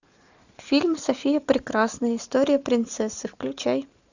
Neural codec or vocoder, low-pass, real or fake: none; 7.2 kHz; real